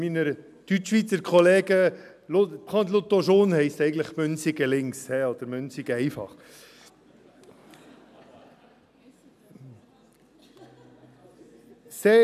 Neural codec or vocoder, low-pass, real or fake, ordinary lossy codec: none; 14.4 kHz; real; none